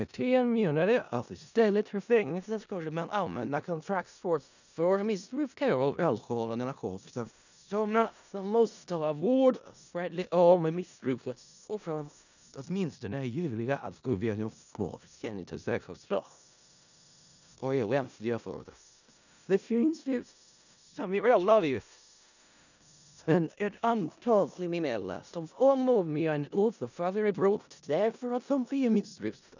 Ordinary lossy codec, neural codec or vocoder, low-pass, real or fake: none; codec, 16 kHz in and 24 kHz out, 0.4 kbps, LongCat-Audio-Codec, four codebook decoder; 7.2 kHz; fake